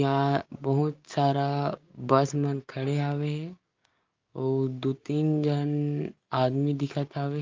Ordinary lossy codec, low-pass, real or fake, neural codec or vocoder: Opus, 24 kbps; 7.2 kHz; fake; codec, 16 kHz, 6 kbps, DAC